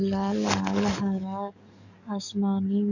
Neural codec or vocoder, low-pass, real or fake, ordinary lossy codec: codec, 44.1 kHz, 7.8 kbps, DAC; 7.2 kHz; fake; none